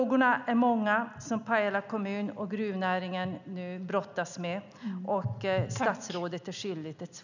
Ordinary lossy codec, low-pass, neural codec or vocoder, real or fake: none; 7.2 kHz; none; real